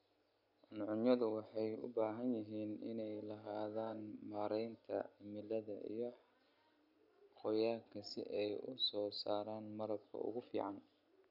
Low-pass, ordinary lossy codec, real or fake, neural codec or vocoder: 5.4 kHz; none; real; none